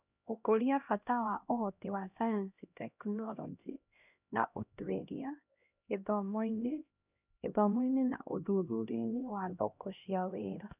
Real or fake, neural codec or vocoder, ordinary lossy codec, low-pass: fake; codec, 16 kHz, 1 kbps, X-Codec, HuBERT features, trained on LibriSpeech; none; 3.6 kHz